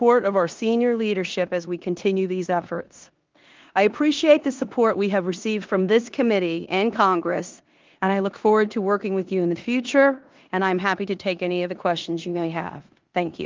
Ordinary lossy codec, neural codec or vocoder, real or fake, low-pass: Opus, 32 kbps; codec, 16 kHz in and 24 kHz out, 0.9 kbps, LongCat-Audio-Codec, four codebook decoder; fake; 7.2 kHz